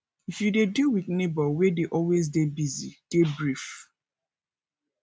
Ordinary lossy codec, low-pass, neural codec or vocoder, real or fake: none; none; none; real